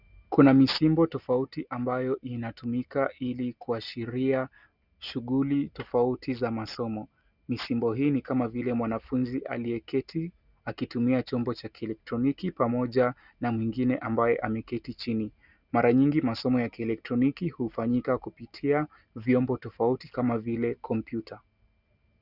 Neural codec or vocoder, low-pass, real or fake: none; 5.4 kHz; real